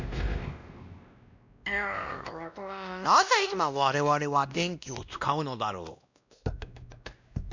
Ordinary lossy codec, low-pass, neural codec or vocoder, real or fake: none; 7.2 kHz; codec, 16 kHz, 1 kbps, X-Codec, WavLM features, trained on Multilingual LibriSpeech; fake